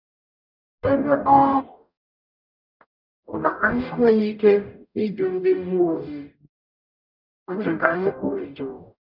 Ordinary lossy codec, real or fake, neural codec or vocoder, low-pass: none; fake; codec, 44.1 kHz, 0.9 kbps, DAC; 5.4 kHz